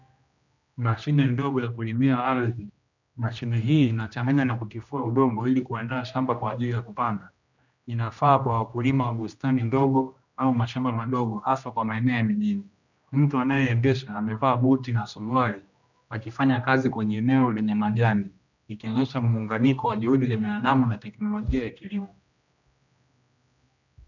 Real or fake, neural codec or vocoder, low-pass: fake; codec, 16 kHz, 1 kbps, X-Codec, HuBERT features, trained on general audio; 7.2 kHz